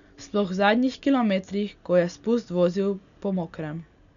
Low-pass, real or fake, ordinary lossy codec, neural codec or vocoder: 7.2 kHz; real; none; none